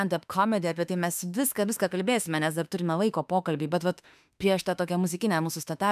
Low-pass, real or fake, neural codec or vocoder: 14.4 kHz; fake; autoencoder, 48 kHz, 32 numbers a frame, DAC-VAE, trained on Japanese speech